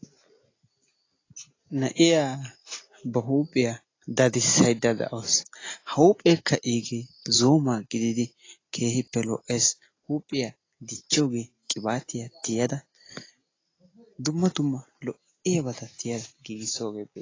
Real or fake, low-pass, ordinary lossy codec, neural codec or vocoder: real; 7.2 kHz; AAC, 32 kbps; none